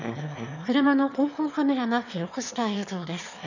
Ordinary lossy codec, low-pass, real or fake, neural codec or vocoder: none; 7.2 kHz; fake; autoencoder, 22.05 kHz, a latent of 192 numbers a frame, VITS, trained on one speaker